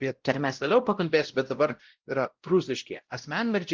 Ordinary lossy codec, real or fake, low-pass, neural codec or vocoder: Opus, 16 kbps; fake; 7.2 kHz; codec, 16 kHz, 0.5 kbps, X-Codec, WavLM features, trained on Multilingual LibriSpeech